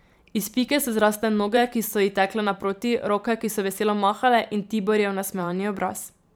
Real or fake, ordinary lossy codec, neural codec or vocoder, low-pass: fake; none; vocoder, 44.1 kHz, 128 mel bands every 512 samples, BigVGAN v2; none